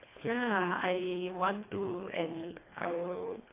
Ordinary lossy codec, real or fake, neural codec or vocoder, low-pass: AAC, 24 kbps; fake; codec, 24 kHz, 1.5 kbps, HILCodec; 3.6 kHz